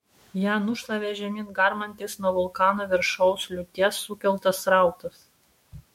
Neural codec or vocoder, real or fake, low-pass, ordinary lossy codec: autoencoder, 48 kHz, 128 numbers a frame, DAC-VAE, trained on Japanese speech; fake; 19.8 kHz; MP3, 64 kbps